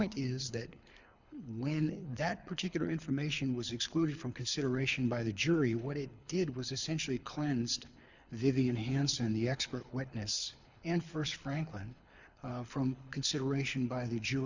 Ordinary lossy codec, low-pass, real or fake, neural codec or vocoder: Opus, 64 kbps; 7.2 kHz; fake; codec, 24 kHz, 6 kbps, HILCodec